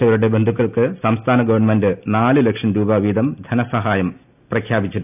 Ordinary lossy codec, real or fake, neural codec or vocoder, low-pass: none; real; none; 3.6 kHz